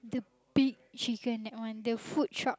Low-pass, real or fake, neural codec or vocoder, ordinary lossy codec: none; real; none; none